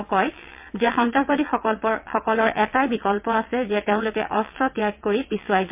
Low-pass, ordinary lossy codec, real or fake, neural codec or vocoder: 3.6 kHz; MP3, 32 kbps; fake; vocoder, 22.05 kHz, 80 mel bands, WaveNeXt